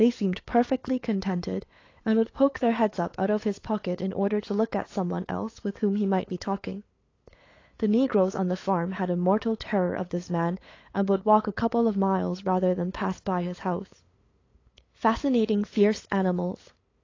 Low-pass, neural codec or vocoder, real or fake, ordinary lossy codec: 7.2 kHz; codec, 16 kHz, 8 kbps, FunCodec, trained on LibriTTS, 25 frames a second; fake; AAC, 32 kbps